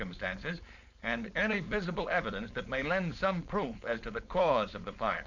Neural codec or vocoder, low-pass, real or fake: codec, 16 kHz, 4.8 kbps, FACodec; 7.2 kHz; fake